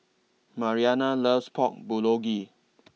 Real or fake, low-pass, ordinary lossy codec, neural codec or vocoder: real; none; none; none